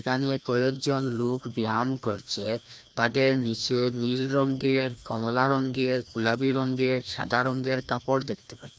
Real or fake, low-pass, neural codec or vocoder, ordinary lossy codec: fake; none; codec, 16 kHz, 1 kbps, FreqCodec, larger model; none